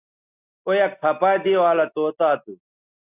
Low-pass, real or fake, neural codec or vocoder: 3.6 kHz; real; none